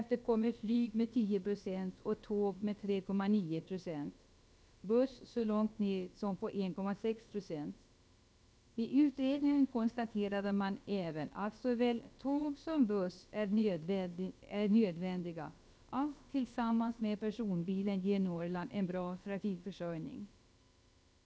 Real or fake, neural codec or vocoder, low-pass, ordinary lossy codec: fake; codec, 16 kHz, about 1 kbps, DyCAST, with the encoder's durations; none; none